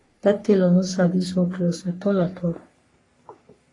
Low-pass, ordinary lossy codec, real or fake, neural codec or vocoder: 10.8 kHz; AAC, 32 kbps; fake; codec, 44.1 kHz, 3.4 kbps, Pupu-Codec